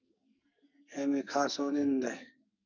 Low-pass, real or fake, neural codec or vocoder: 7.2 kHz; fake; codec, 44.1 kHz, 2.6 kbps, SNAC